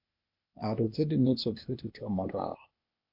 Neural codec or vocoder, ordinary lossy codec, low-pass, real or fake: codec, 16 kHz, 0.8 kbps, ZipCodec; MP3, 48 kbps; 5.4 kHz; fake